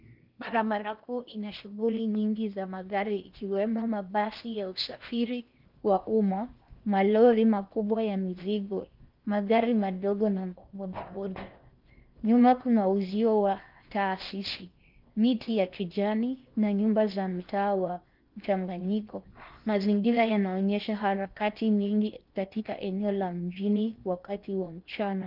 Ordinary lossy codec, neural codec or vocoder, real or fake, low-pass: Opus, 24 kbps; codec, 16 kHz in and 24 kHz out, 0.8 kbps, FocalCodec, streaming, 65536 codes; fake; 5.4 kHz